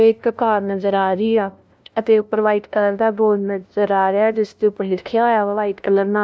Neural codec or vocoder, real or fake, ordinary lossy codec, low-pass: codec, 16 kHz, 0.5 kbps, FunCodec, trained on LibriTTS, 25 frames a second; fake; none; none